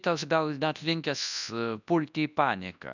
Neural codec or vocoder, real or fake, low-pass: codec, 24 kHz, 0.9 kbps, WavTokenizer, large speech release; fake; 7.2 kHz